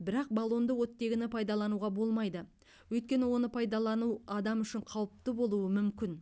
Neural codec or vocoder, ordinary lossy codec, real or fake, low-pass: none; none; real; none